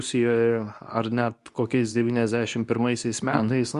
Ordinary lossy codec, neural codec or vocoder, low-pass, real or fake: Opus, 64 kbps; codec, 24 kHz, 0.9 kbps, WavTokenizer, medium speech release version 2; 10.8 kHz; fake